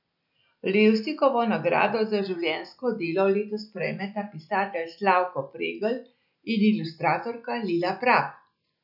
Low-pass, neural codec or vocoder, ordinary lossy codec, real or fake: 5.4 kHz; none; none; real